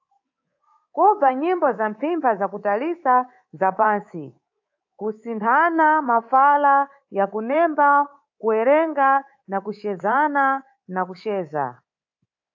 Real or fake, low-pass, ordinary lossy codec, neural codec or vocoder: fake; 7.2 kHz; AAC, 48 kbps; codec, 24 kHz, 3.1 kbps, DualCodec